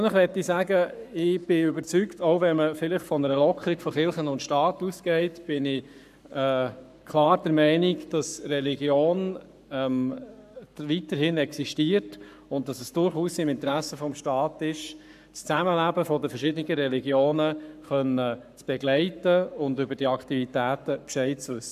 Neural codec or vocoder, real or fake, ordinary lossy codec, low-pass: codec, 44.1 kHz, 7.8 kbps, Pupu-Codec; fake; none; 14.4 kHz